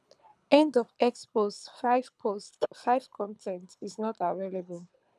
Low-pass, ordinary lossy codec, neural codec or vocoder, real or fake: none; none; codec, 24 kHz, 6 kbps, HILCodec; fake